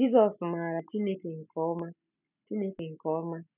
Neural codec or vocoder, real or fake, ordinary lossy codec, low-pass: none; real; none; 3.6 kHz